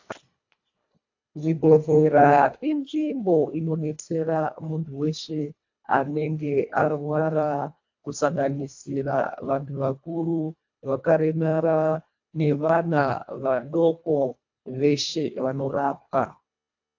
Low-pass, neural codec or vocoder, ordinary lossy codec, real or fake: 7.2 kHz; codec, 24 kHz, 1.5 kbps, HILCodec; AAC, 48 kbps; fake